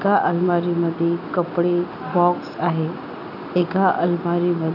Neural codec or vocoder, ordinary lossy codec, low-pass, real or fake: none; none; 5.4 kHz; real